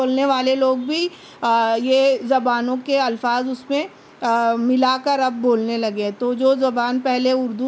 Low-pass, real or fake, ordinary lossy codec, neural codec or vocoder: none; real; none; none